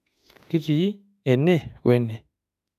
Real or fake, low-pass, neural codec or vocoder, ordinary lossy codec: fake; 14.4 kHz; autoencoder, 48 kHz, 32 numbers a frame, DAC-VAE, trained on Japanese speech; none